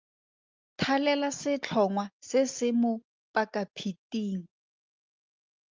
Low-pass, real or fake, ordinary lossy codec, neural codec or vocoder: 7.2 kHz; real; Opus, 32 kbps; none